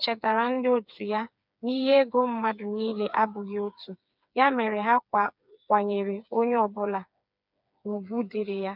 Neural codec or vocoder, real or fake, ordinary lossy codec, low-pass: codec, 16 kHz, 4 kbps, FreqCodec, smaller model; fake; none; 5.4 kHz